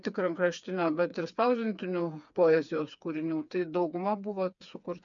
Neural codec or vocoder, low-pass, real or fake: codec, 16 kHz, 4 kbps, FreqCodec, smaller model; 7.2 kHz; fake